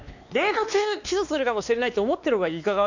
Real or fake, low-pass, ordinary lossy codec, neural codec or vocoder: fake; 7.2 kHz; none; codec, 16 kHz, 2 kbps, X-Codec, WavLM features, trained on Multilingual LibriSpeech